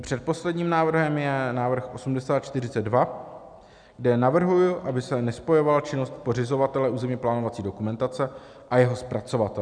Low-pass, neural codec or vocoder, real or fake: 9.9 kHz; none; real